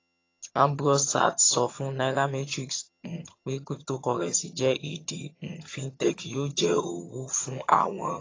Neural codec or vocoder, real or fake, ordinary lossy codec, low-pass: vocoder, 22.05 kHz, 80 mel bands, HiFi-GAN; fake; AAC, 32 kbps; 7.2 kHz